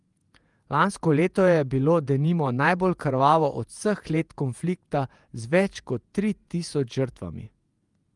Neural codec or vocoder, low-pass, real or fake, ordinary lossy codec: vocoder, 48 kHz, 128 mel bands, Vocos; 10.8 kHz; fake; Opus, 32 kbps